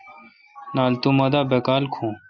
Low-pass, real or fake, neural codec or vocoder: 7.2 kHz; real; none